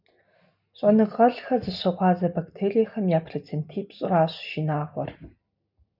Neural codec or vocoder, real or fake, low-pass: none; real; 5.4 kHz